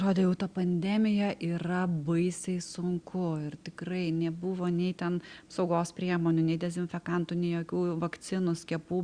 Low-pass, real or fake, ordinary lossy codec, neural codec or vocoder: 9.9 kHz; real; Opus, 64 kbps; none